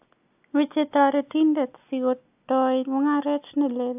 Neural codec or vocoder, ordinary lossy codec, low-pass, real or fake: none; AAC, 32 kbps; 3.6 kHz; real